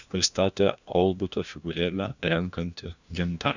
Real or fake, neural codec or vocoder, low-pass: fake; codec, 16 kHz, 1 kbps, FunCodec, trained on LibriTTS, 50 frames a second; 7.2 kHz